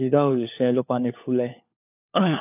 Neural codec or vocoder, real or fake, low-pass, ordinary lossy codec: codec, 16 kHz, 4 kbps, FunCodec, trained on LibriTTS, 50 frames a second; fake; 3.6 kHz; none